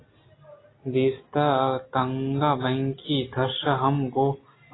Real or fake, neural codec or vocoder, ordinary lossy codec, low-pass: real; none; AAC, 16 kbps; 7.2 kHz